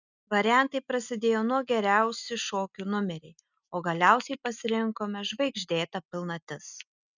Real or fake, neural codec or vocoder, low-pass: real; none; 7.2 kHz